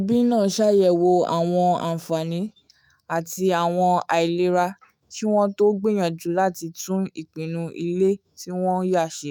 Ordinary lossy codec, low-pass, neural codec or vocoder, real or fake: none; none; autoencoder, 48 kHz, 128 numbers a frame, DAC-VAE, trained on Japanese speech; fake